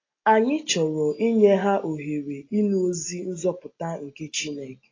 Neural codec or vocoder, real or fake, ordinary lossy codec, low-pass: none; real; AAC, 32 kbps; 7.2 kHz